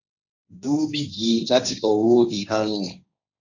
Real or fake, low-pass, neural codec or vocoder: fake; 7.2 kHz; codec, 16 kHz, 1.1 kbps, Voila-Tokenizer